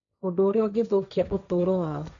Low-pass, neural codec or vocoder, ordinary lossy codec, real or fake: 7.2 kHz; codec, 16 kHz, 1.1 kbps, Voila-Tokenizer; none; fake